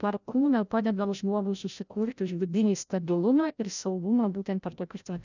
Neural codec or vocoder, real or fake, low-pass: codec, 16 kHz, 0.5 kbps, FreqCodec, larger model; fake; 7.2 kHz